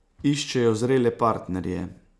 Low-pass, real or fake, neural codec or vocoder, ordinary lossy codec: none; real; none; none